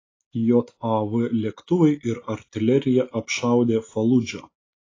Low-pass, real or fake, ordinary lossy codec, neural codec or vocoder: 7.2 kHz; real; AAC, 32 kbps; none